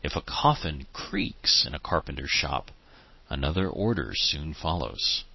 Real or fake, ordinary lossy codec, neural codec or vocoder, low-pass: real; MP3, 24 kbps; none; 7.2 kHz